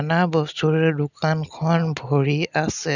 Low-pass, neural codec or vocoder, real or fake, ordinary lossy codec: 7.2 kHz; none; real; none